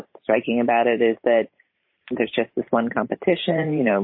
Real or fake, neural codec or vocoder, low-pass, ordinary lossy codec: fake; vocoder, 44.1 kHz, 128 mel bands every 512 samples, BigVGAN v2; 5.4 kHz; MP3, 24 kbps